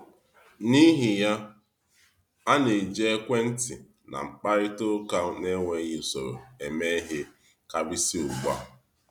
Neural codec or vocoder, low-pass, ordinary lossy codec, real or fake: none; 19.8 kHz; none; real